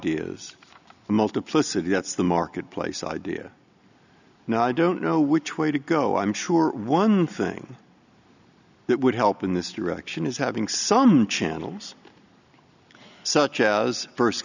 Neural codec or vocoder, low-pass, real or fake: none; 7.2 kHz; real